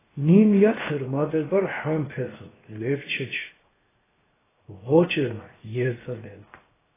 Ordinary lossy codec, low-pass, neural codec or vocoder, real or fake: AAC, 16 kbps; 3.6 kHz; codec, 16 kHz, 0.7 kbps, FocalCodec; fake